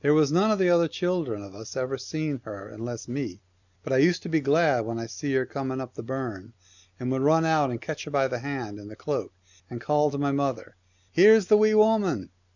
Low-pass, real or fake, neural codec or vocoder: 7.2 kHz; real; none